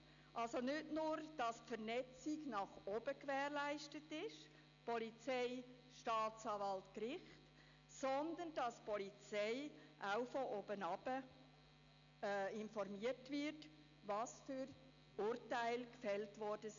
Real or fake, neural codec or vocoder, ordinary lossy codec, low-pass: real; none; none; 7.2 kHz